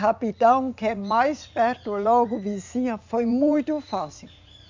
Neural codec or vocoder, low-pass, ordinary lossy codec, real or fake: vocoder, 44.1 kHz, 80 mel bands, Vocos; 7.2 kHz; MP3, 64 kbps; fake